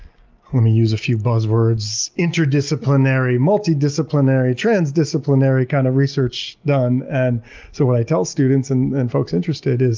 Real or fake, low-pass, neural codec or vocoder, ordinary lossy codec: real; 7.2 kHz; none; Opus, 32 kbps